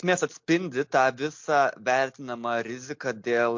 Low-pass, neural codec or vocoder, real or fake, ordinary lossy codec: 7.2 kHz; none; real; MP3, 48 kbps